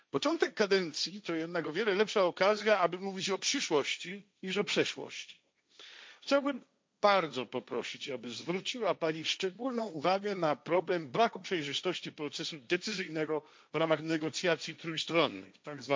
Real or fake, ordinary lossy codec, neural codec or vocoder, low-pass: fake; none; codec, 16 kHz, 1.1 kbps, Voila-Tokenizer; none